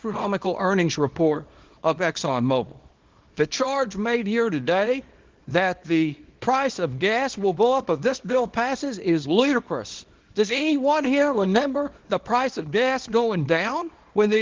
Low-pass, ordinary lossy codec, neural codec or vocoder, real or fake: 7.2 kHz; Opus, 16 kbps; codec, 24 kHz, 0.9 kbps, WavTokenizer, small release; fake